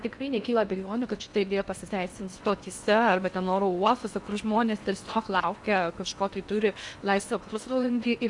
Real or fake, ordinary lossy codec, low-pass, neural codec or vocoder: fake; AAC, 64 kbps; 10.8 kHz; codec, 16 kHz in and 24 kHz out, 0.8 kbps, FocalCodec, streaming, 65536 codes